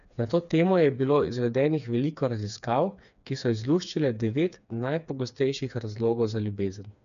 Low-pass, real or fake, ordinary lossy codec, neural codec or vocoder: 7.2 kHz; fake; none; codec, 16 kHz, 4 kbps, FreqCodec, smaller model